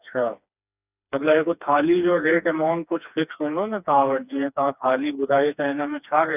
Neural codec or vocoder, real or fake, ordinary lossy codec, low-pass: codec, 16 kHz, 2 kbps, FreqCodec, smaller model; fake; none; 3.6 kHz